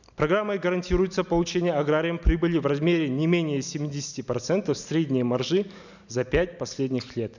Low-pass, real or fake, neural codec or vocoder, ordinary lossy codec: 7.2 kHz; real; none; none